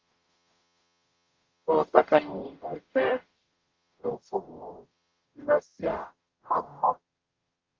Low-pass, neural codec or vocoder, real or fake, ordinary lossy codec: 7.2 kHz; codec, 44.1 kHz, 0.9 kbps, DAC; fake; Opus, 32 kbps